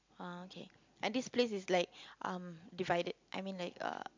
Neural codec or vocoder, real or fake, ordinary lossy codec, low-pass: none; real; MP3, 64 kbps; 7.2 kHz